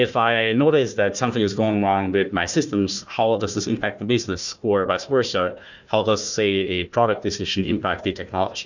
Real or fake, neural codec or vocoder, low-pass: fake; codec, 16 kHz, 1 kbps, FunCodec, trained on Chinese and English, 50 frames a second; 7.2 kHz